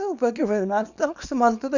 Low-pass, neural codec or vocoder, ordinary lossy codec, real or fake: 7.2 kHz; codec, 24 kHz, 0.9 kbps, WavTokenizer, small release; none; fake